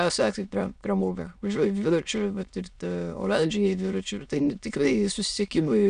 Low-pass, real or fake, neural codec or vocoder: 9.9 kHz; fake; autoencoder, 22.05 kHz, a latent of 192 numbers a frame, VITS, trained on many speakers